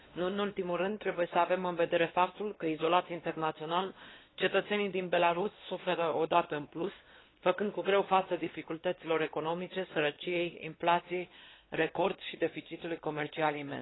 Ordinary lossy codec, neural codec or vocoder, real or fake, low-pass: AAC, 16 kbps; codec, 16 kHz, 2 kbps, X-Codec, WavLM features, trained on Multilingual LibriSpeech; fake; 7.2 kHz